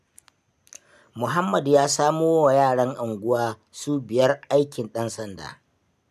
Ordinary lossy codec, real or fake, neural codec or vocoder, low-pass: none; real; none; 14.4 kHz